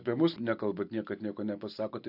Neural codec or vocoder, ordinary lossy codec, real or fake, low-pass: none; AAC, 48 kbps; real; 5.4 kHz